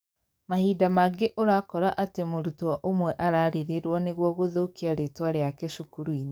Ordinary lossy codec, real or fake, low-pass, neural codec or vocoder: none; fake; none; codec, 44.1 kHz, 7.8 kbps, DAC